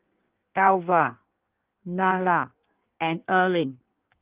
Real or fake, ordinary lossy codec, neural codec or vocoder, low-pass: fake; Opus, 32 kbps; codec, 16 kHz in and 24 kHz out, 1.1 kbps, FireRedTTS-2 codec; 3.6 kHz